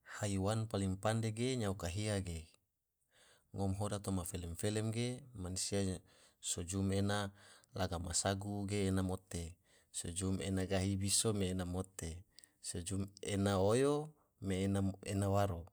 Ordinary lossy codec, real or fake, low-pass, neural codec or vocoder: none; real; none; none